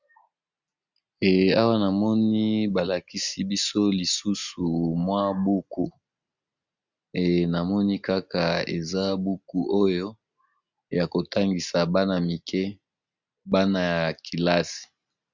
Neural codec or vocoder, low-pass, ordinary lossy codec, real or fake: none; 7.2 kHz; Opus, 64 kbps; real